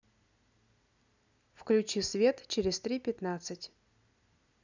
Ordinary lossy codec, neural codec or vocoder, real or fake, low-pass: none; none; real; 7.2 kHz